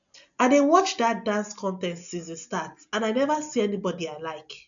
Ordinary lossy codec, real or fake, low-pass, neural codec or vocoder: none; real; 7.2 kHz; none